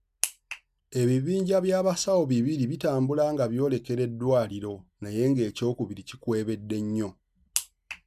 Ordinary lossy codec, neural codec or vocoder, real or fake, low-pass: none; none; real; 14.4 kHz